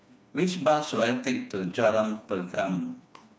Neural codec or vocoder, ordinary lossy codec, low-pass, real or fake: codec, 16 kHz, 2 kbps, FreqCodec, smaller model; none; none; fake